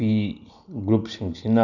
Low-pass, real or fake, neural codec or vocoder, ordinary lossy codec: 7.2 kHz; real; none; none